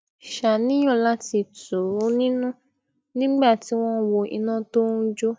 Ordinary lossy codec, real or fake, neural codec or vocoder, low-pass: none; real; none; none